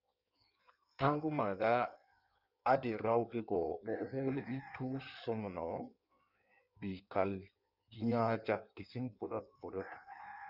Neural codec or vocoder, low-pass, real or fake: codec, 16 kHz in and 24 kHz out, 1.1 kbps, FireRedTTS-2 codec; 5.4 kHz; fake